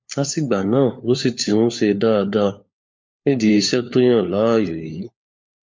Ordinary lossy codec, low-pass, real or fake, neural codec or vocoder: MP3, 48 kbps; 7.2 kHz; fake; codec, 16 kHz, 4 kbps, FunCodec, trained on LibriTTS, 50 frames a second